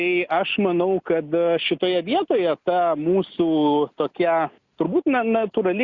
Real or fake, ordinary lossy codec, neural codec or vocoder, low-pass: real; Opus, 64 kbps; none; 7.2 kHz